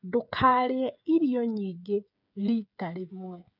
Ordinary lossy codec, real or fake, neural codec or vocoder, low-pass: none; fake; codec, 16 kHz, 8 kbps, FreqCodec, smaller model; 5.4 kHz